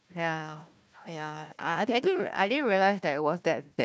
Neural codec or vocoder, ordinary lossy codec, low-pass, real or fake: codec, 16 kHz, 1 kbps, FunCodec, trained on Chinese and English, 50 frames a second; none; none; fake